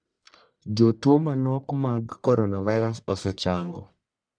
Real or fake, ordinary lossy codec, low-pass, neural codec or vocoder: fake; none; 9.9 kHz; codec, 44.1 kHz, 1.7 kbps, Pupu-Codec